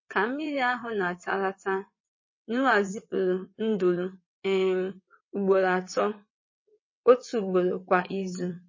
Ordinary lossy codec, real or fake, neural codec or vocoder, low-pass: MP3, 32 kbps; fake; vocoder, 22.05 kHz, 80 mel bands, WaveNeXt; 7.2 kHz